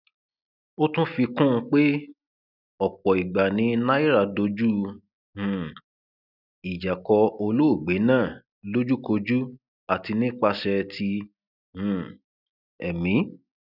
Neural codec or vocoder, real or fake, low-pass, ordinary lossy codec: none; real; 5.4 kHz; none